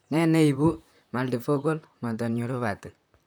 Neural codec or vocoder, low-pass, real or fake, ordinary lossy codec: vocoder, 44.1 kHz, 128 mel bands, Pupu-Vocoder; none; fake; none